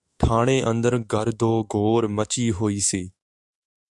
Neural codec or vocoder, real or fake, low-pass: autoencoder, 48 kHz, 128 numbers a frame, DAC-VAE, trained on Japanese speech; fake; 10.8 kHz